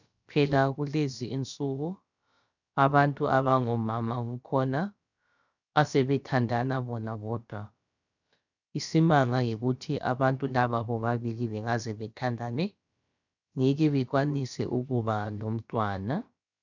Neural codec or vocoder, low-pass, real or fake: codec, 16 kHz, about 1 kbps, DyCAST, with the encoder's durations; 7.2 kHz; fake